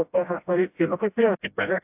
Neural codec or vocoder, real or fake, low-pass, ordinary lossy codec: codec, 16 kHz, 0.5 kbps, FreqCodec, smaller model; fake; 3.6 kHz; AAC, 32 kbps